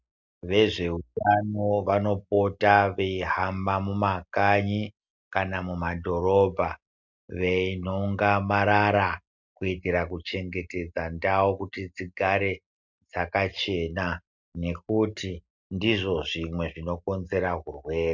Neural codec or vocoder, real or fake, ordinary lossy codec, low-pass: none; real; MP3, 64 kbps; 7.2 kHz